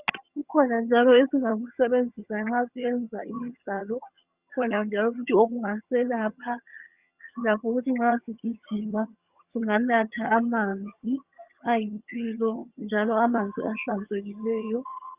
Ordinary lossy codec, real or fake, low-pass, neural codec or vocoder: Opus, 64 kbps; fake; 3.6 kHz; vocoder, 22.05 kHz, 80 mel bands, HiFi-GAN